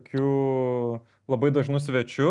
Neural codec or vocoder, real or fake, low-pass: none; real; 10.8 kHz